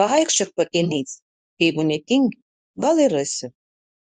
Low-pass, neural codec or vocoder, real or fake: 10.8 kHz; codec, 24 kHz, 0.9 kbps, WavTokenizer, medium speech release version 2; fake